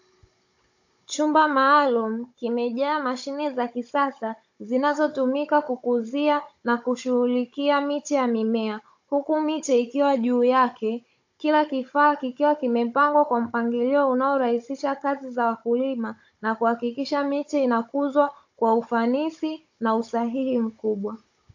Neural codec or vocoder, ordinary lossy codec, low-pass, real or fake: codec, 16 kHz, 16 kbps, FunCodec, trained on Chinese and English, 50 frames a second; AAC, 48 kbps; 7.2 kHz; fake